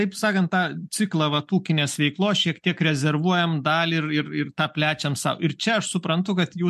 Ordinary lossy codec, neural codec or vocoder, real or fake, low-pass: MP3, 64 kbps; none; real; 14.4 kHz